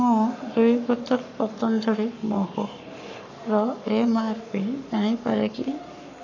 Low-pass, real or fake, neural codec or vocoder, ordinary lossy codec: 7.2 kHz; fake; codec, 44.1 kHz, 7.8 kbps, Pupu-Codec; none